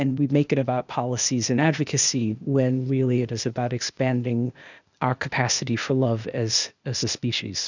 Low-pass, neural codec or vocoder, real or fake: 7.2 kHz; codec, 16 kHz, 0.8 kbps, ZipCodec; fake